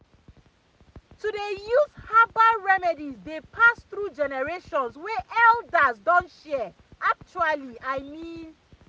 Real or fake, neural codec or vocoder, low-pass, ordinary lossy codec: real; none; none; none